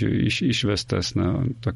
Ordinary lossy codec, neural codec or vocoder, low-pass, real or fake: MP3, 48 kbps; none; 19.8 kHz; real